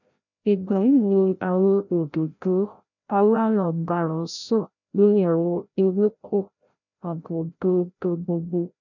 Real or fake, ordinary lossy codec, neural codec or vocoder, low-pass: fake; none; codec, 16 kHz, 0.5 kbps, FreqCodec, larger model; 7.2 kHz